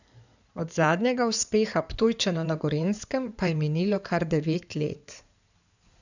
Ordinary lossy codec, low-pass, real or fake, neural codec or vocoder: none; 7.2 kHz; fake; codec, 16 kHz in and 24 kHz out, 2.2 kbps, FireRedTTS-2 codec